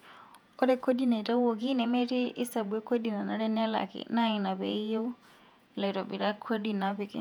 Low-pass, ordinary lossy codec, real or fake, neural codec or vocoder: 19.8 kHz; none; fake; vocoder, 48 kHz, 128 mel bands, Vocos